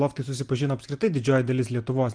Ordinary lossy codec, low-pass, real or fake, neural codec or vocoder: Opus, 24 kbps; 9.9 kHz; real; none